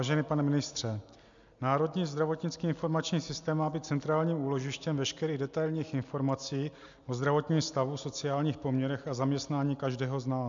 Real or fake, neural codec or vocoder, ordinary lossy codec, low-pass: real; none; MP3, 64 kbps; 7.2 kHz